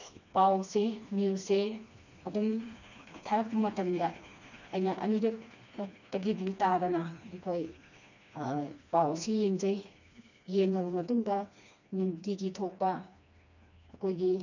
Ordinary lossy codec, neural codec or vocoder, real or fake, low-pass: none; codec, 16 kHz, 2 kbps, FreqCodec, smaller model; fake; 7.2 kHz